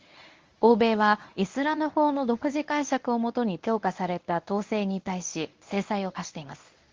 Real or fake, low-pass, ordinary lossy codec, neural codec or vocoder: fake; 7.2 kHz; Opus, 32 kbps; codec, 24 kHz, 0.9 kbps, WavTokenizer, medium speech release version 1